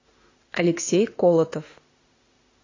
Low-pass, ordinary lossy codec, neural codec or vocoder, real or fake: 7.2 kHz; AAC, 32 kbps; autoencoder, 48 kHz, 32 numbers a frame, DAC-VAE, trained on Japanese speech; fake